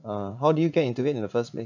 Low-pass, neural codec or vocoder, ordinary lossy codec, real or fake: 7.2 kHz; vocoder, 44.1 kHz, 80 mel bands, Vocos; none; fake